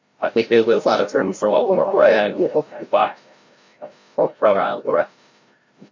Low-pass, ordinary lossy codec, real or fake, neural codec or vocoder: 7.2 kHz; MP3, 48 kbps; fake; codec, 16 kHz, 0.5 kbps, FreqCodec, larger model